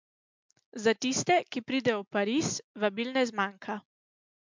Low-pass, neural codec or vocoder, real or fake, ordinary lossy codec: 7.2 kHz; none; real; MP3, 64 kbps